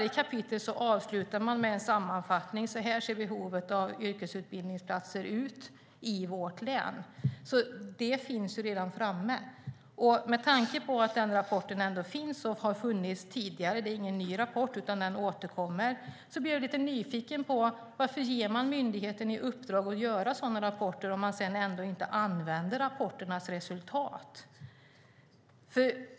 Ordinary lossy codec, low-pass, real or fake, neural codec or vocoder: none; none; real; none